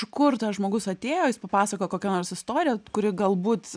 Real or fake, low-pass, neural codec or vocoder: real; 9.9 kHz; none